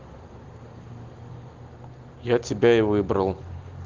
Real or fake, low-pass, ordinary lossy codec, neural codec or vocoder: real; 7.2 kHz; Opus, 16 kbps; none